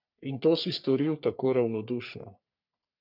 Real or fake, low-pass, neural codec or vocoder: fake; 5.4 kHz; codec, 44.1 kHz, 3.4 kbps, Pupu-Codec